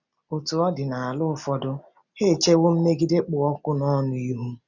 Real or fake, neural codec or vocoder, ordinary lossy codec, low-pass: real; none; none; 7.2 kHz